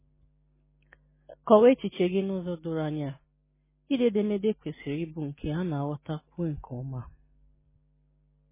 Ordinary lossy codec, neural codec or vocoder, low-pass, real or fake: MP3, 16 kbps; none; 3.6 kHz; real